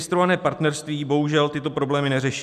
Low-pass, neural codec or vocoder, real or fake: 14.4 kHz; none; real